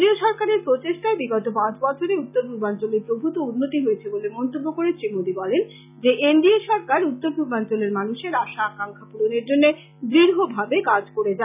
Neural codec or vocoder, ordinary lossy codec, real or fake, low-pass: none; none; real; 3.6 kHz